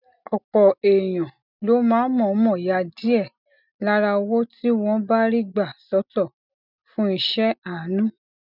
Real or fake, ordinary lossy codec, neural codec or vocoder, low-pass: real; none; none; 5.4 kHz